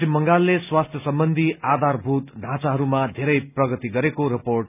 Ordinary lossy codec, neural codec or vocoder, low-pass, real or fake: none; none; 3.6 kHz; real